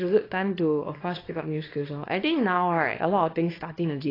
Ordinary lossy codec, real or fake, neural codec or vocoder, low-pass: AAC, 24 kbps; fake; codec, 24 kHz, 0.9 kbps, WavTokenizer, small release; 5.4 kHz